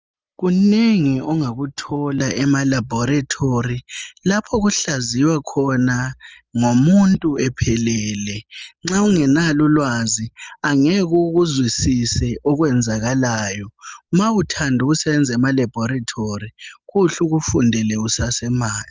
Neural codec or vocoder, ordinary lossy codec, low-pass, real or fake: none; Opus, 24 kbps; 7.2 kHz; real